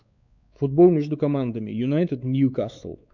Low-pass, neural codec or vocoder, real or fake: 7.2 kHz; codec, 16 kHz, 4 kbps, X-Codec, WavLM features, trained on Multilingual LibriSpeech; fake